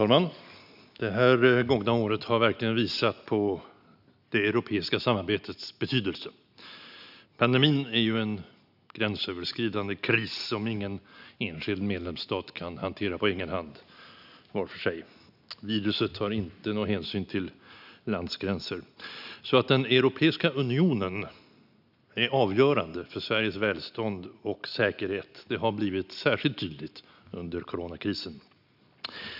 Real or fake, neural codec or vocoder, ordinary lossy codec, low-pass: real; none; none; 5.4 kHz